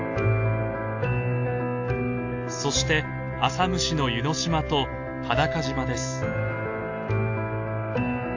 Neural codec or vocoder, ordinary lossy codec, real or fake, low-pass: none; AAC, 48 kbps; real; 7.2 kHz